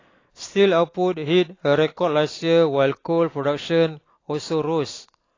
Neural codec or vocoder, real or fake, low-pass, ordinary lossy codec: none; real; 7.2 kHz; AAC, 32 kbps